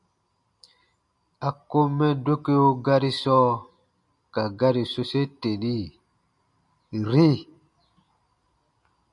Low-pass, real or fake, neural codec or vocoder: 10.8 kHz; real; none